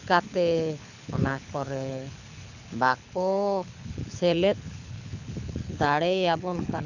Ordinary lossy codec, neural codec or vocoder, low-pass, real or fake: none; codec, 24 kHz, 6 kbps, HILCodec; 7.2 kHz; fake